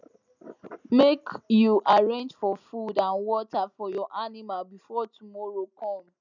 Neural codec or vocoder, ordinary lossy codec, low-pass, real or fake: none; none; 7.2 kHz; real